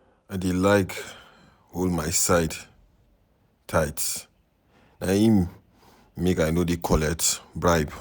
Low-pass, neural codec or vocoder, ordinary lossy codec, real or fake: none; none; none; real